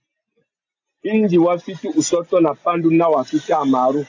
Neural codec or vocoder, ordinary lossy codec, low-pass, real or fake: none; AAC, 48 kbps; 7.2 kHz; real